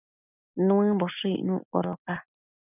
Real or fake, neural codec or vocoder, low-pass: real; none; 3.6 kHz